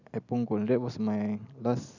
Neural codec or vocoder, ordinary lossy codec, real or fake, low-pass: none; none; real; 7.2 kHz